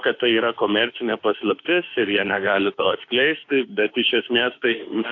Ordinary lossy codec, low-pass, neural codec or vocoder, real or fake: AAC, 48 kbps; 7.2 kHz; autoencoder, 48 kHz, 32 numbers a frame, DAC-VAE, trained on Japanese speech; fake